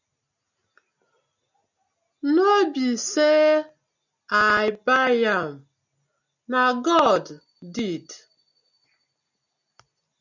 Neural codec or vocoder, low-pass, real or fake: none; 7.2 kHz; real